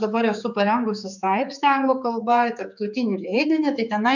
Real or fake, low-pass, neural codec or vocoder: fake; 7.2 kHz; codec, 16 kHz, 4 kbps, X-Codec, HuBERT features, trained on general audio